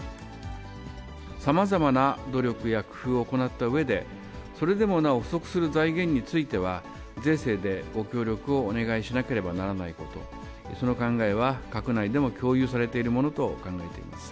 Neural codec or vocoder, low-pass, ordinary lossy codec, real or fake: none; none; none; real